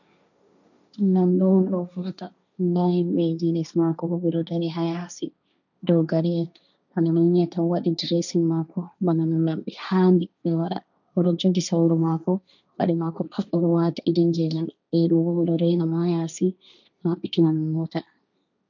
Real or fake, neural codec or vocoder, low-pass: fake; codec, 16 kHz, 1.1 kbps, Voila-Tokenizer; 7.2 kHz